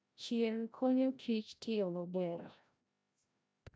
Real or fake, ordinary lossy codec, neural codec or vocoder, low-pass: fake; none; codec, 16 kHz, 0.5 kbps, FreqCodec, larger model; none